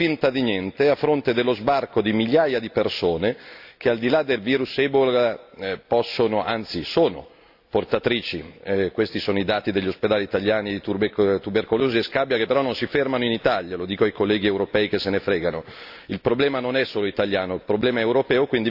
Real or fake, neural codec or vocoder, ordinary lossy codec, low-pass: real; none; AAC, 48 kbps; 5.4 kHz